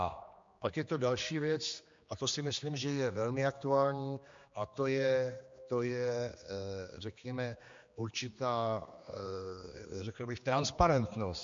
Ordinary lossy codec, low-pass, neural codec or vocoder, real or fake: MP3, 48 kbps; 7.2 kHz; codec, 16 kHz, 2 kbps, X-Codec, HuBERT features, trained on general audio; fake